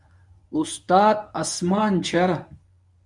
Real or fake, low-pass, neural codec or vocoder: fake; 10.8 kHz; codec, 24 kHz, 0.9 kbps, WavTokenizer, medium speech release version 1